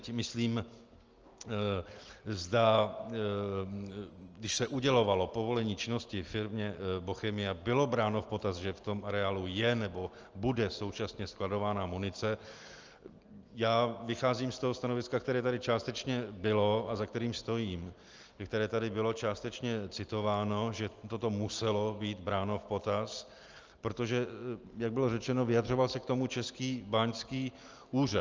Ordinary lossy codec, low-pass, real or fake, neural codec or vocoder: Opus, 24 kbps; 7.2 kHz; real; none